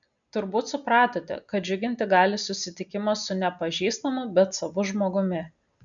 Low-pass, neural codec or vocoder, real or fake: 7.2 kHz; none; real